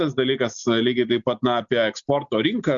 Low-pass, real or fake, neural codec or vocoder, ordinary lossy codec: 7.2 kHz; real; none; Opus, 64 kbps